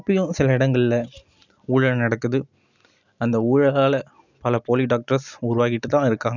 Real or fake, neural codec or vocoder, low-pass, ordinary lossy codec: real; none; 7.2 kHz; none